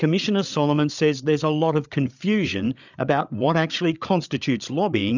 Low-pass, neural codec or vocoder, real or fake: 7.2 kHz; codec, 16 kHz, 8 kbps, FreqCodec, larger model; fake